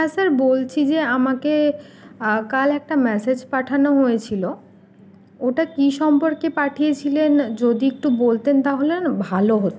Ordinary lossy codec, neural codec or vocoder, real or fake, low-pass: none; none; real; none